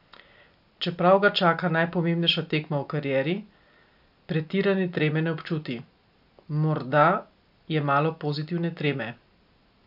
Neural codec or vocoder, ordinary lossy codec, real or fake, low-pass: none; AAC, 48 kbps; real; 5.4 kHz